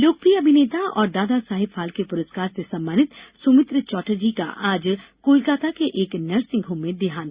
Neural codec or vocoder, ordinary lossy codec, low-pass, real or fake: none; Opus, 64 kbps; 3.6 kHz; real